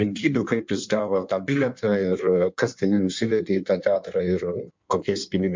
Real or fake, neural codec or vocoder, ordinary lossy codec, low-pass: fake; codec, 16 kHz in and 24 kHz out, 1.1 kbps, FireRedTTS-2 codec; MP3, 64 kbps; 7.2 kHz